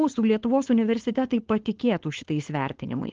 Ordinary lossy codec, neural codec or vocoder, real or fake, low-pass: Opus, 16 kbps; codec, 16 kHz, 4.8 kbps, FACodec; fake; 7.2 kHz